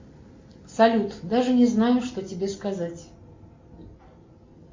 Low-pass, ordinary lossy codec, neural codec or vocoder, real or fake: 7.2 kHz; MP3, 48 kbps; none; real